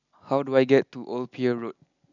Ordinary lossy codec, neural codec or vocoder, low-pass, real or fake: none; none; 7.2 kHz; real